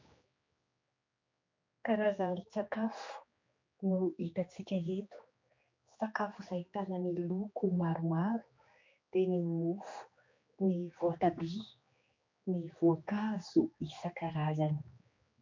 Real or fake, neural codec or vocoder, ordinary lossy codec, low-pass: fake; codec, 16 kHz, 2 kbps, X-Codec, HuBERT features, trained on general audio; MP3, 48 kbps; 7.2 kHz